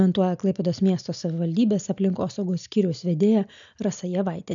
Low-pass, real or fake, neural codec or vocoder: 7.2 kHz; real; none